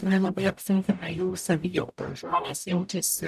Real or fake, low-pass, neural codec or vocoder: fake; 14.4 kHz; codec, 44.1 kHz, 0.9 kbps, DAC